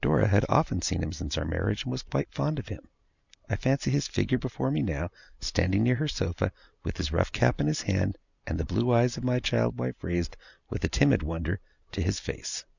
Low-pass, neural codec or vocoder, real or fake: 7.2 kHz; none; real